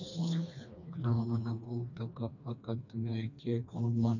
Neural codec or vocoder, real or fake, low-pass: codec, 16 kHz, 2 kbps, FreqCodec, smaller model; fake; 7.2 kHz